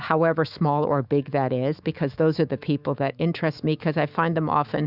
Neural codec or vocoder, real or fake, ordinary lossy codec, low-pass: autoencoder, 48 kHz, 128 numbers a frame, DAC-VAE, trained on Japanese speech; fake; Opus, 64 kbps; 5.4 kHz